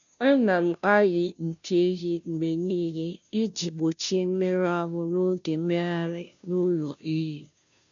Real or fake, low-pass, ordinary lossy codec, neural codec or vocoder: fake; 7.2 kHz; none; codec, 16 kHz, 0.5 kbps, FunCodec, trained on Chinese and English, 25 frames a second